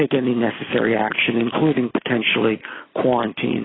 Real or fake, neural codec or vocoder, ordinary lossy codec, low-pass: real; none; AAC, 16 kbps; 7.2 kHz